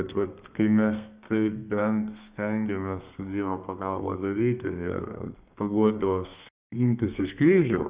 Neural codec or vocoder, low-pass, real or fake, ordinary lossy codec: codec, 32 kHz, 1.9 kbps, SNAC; 3.6 kHz; fake; Opus, 64 kbps